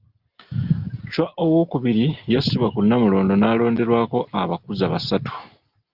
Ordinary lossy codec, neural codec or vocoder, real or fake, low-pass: Opus, 32 kbps; none; real; 5.4 kHz